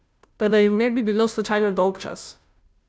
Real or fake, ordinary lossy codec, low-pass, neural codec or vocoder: fake; none; none; codec, 16 kHz, 0.5 kbps, FunCodec, trained on Chinese and English, 25 frames a second